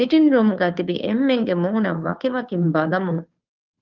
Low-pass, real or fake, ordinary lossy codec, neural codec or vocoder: 7.2 kHz; fake; Opus, 32 kbps; codec, 16 kHz, 2 kbps, FunCodec, trained on Chinese and English, 25 frames a second